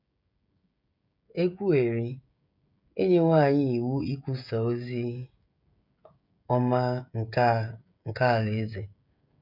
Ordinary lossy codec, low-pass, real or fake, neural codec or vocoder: none; 5.4 kHz; fake; codec, 16 kHz, 16 kbps, FreqCodec, smaller model